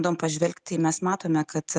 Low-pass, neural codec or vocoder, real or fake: 9.9 kHz; none; real